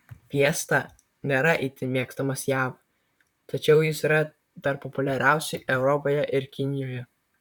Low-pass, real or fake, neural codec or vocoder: 19.8 kHz; fake; vocoder, 44.1 kHz, 128 mel bands, Pupu-Vocoder